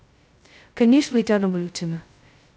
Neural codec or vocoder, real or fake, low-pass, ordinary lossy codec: codec, 16 kHz, 0.2 kbps, FocalCodec; fake; none; none